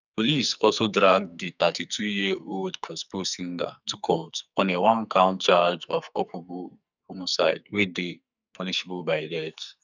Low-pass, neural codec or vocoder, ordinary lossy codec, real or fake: 7.2 kHz; codec, 44.1 kHz, 2.6 kbps, SNAC; none; fake